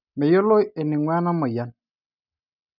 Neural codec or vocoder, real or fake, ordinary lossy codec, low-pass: none; real; none; 5.4 kHz